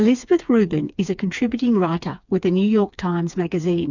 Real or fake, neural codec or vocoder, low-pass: fake; codec, 16 kHz, 4 kbps, FreqCodec, smaller model; 7.2 kHz